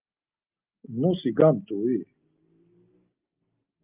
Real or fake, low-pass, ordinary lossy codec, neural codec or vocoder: real; 3.6 kHz; Opus, 32 kbps; none